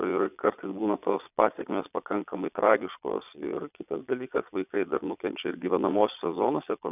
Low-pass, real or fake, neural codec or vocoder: 3.6 kHz; fake; vocoder, 22.05 kHz, 80 mel bands, Vocos